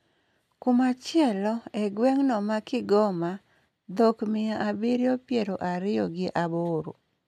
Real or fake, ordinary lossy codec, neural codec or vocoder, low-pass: real; none; none; 14.4 kHz